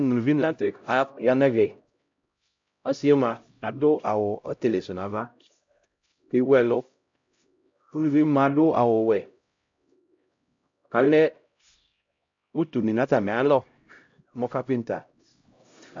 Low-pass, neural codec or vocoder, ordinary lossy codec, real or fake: 7.2 kHz; codec, 16 kHz, 0.5 kbps, X-Codec, HuBERT features, trained on LibriSpeech; MP3, 48 kbps; fake